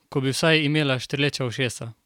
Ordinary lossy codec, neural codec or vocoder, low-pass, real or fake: none; none; 19.8 kHz; real